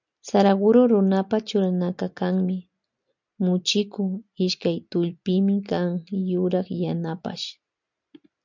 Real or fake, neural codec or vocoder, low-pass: real; none; 7.2 kHz